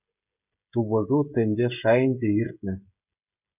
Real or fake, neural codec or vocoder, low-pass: fake; codec, 16 kHz, 16 kbps, FreqCodec, smaller model; 3.6 kHz